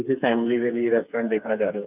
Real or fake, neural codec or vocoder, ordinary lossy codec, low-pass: fake; codec, 16 kHz, 4 kbps, FreqCodec, smaller model; none; 3.6 kHz